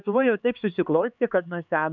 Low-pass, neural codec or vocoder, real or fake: 7.2 kHz; codec, 16 kHz, 4 kbps, X-Codec, HuBERT features, trained on LibriSpeech; fake